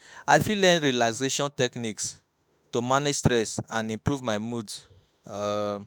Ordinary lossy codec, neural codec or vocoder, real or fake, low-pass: none; autoencoder, 48 kHz, 32 numbers a frame, DAC-VAE, trained on Japanese speech; fake; none